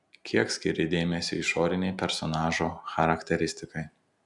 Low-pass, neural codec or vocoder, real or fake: 10.8 kHz; none; real